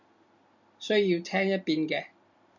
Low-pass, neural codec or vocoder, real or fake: 7.2 kHz; none; real